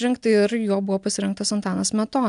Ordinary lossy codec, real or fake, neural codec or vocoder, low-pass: AAC, 96 kbps; real; none; 10.8 kHz